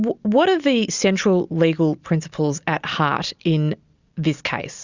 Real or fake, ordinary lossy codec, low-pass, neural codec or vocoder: real; Opus, 64 kbps; 7.2 kHz; none